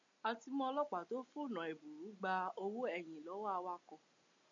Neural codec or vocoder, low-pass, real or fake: none; 7.2 kHz; real